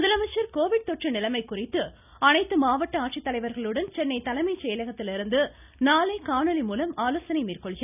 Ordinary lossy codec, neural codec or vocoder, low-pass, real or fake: none; none; 3.6 kHz; real